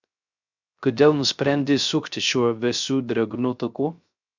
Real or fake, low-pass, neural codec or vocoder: fake; 7.2 kHz; codec, 16 kHz, 0.3 kbps, FocalCodec